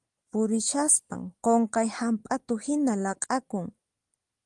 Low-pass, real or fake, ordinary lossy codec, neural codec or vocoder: 10.8 kHz; real; Opus, 24 kbps; none